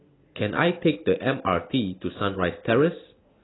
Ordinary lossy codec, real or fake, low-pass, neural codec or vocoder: AAC, 16 kbps; real; 7.2 kHz; none